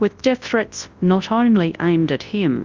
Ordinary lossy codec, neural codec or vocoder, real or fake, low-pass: Opus, 32 kbps; codec, 24 kHz, 0.9 kbps, WavTokenizer, large speech release; fake; 7.2 kHz